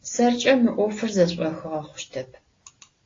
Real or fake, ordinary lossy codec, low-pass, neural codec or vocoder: real; AAC, 32 kbps; 7.2 kHz; none